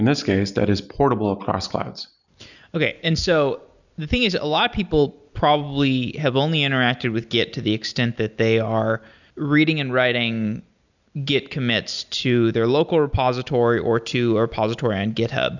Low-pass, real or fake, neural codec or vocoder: 7.2 kHz; real; none